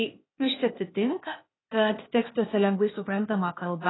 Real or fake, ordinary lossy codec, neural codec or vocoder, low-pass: fake; AAC, 16 kbps; codec, 16 kHz, 0.8 kbps, ZipCodec; 7.2 kHz